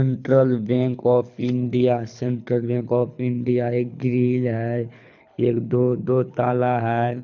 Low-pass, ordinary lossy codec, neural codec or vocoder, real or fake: 7.2 kHz; none; codec, 24 kHz, 6 kbps, HILCodec; fake